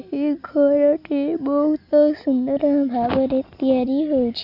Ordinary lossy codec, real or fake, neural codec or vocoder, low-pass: none; real; none; 5.4 kHz